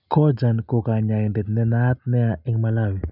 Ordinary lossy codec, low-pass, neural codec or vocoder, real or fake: none; 5.4 kHz; none; real